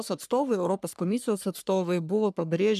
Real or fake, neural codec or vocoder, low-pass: fake; codec, 44.1 kHz, 3.4 kbps, Pupu-Codec; 14.4 kHz